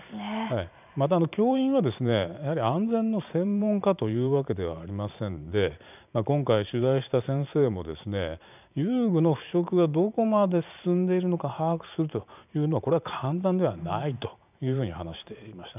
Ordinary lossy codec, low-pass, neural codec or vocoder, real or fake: none; 3.6 kHz; vocoder, 44.1 kHz, 128 mel bands every 512 samples, BigVGAN v2; fake